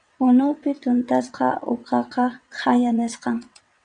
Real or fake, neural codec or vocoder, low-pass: fake; vocoder, 22.05 kHz, 80 mel bands, WaveNeXt; 9.9 kHz